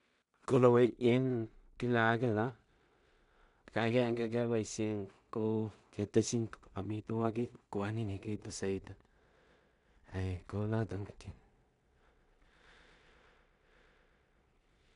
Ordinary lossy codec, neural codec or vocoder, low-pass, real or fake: none; codec, 16 kHz in and 24 kHz out, 0.4 kbps, LongCat-Audio-Codec, two codebook decoder; 10.8 kHz; fake